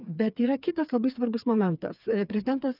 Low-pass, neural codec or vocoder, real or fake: 5.4 kHz; codec, 16 kHz, 4 kbps, FreqCodec, smaller model; fake